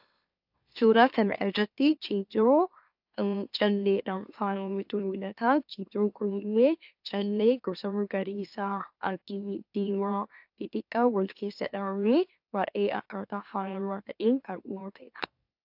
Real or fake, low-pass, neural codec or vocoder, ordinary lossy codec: fake; 5.4 kHz; autoencoder, 44.1 kHz, a latent of 192 numbers a frame, MeloTTS; AAC, 48 kbps